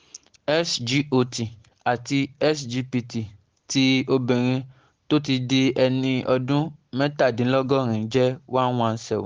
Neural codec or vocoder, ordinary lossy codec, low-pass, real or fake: none; Opus, 16 kbps; 7.2 kHz; real